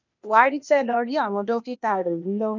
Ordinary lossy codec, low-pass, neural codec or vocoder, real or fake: none; 7.2 kHz; codec, 16 kHz, 0.8 kbps, ZipCodec; fake